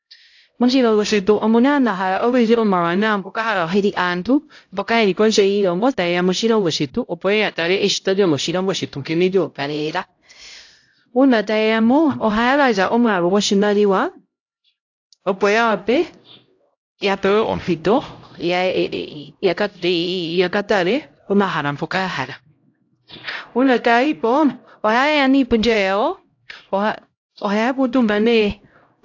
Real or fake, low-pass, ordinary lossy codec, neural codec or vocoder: fake; 7.2 kHz; AAC, 48 kbps; codec, 16 kHz, 0.5 kbps, X-Codec, HuBERT features, trained on LibriSpeech